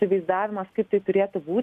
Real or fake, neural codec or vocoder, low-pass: real; none; 14.4 kHz